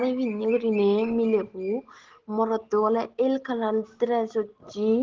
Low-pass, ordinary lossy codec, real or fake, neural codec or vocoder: 7.2 kHz; Opus, 16 kbps; real; none